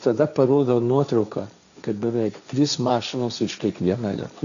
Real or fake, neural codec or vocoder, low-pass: fake; codec, 16 kHz, 1.1 kbps, Voila-Tokenizer; 7.2 kHz